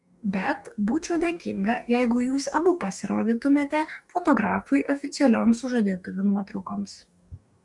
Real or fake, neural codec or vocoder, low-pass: fake; codec, 44.1 kHz, 2.6 kbps, DAC; 10.8 kHz